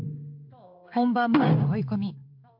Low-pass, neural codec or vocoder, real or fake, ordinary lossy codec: 5.4 kHz; codec, 16 kHz in and 24 kHz out, 1 kbps, XY-Tokenizer; fake; AAC, 48 kbps